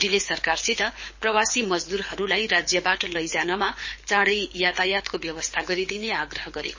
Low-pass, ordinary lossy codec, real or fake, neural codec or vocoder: 7.2 kHz; MP3, 32 kbps; fake; codec, 24 kHz, 6 kbps, HILCodec